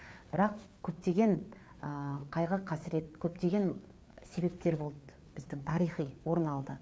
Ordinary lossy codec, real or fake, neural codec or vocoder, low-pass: none; fake; codec, 16 kHz, 4 kbps, FreqCodec, larger model; none